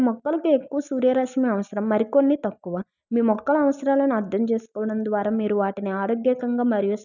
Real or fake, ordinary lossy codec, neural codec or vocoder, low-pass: real; none; none; 7.2 kHz